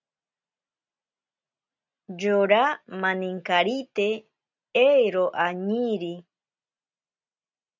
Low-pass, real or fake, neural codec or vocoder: 7.2 kHz; real; none